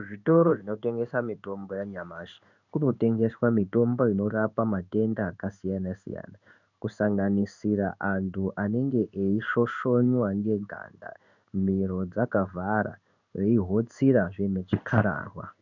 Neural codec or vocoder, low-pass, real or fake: codec, 16 kHz in and 24 kHz out, 1 kbps, XY-Tokenizer; 7.2 kHz; fake